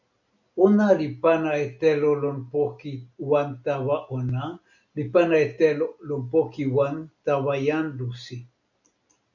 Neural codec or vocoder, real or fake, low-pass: none; real; 7.2 kHz